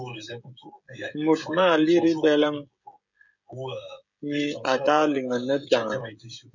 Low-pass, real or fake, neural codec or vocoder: 7.2 kHz; fake; codec, 16 kHz, 16 kbps, FreqCodec, smaller model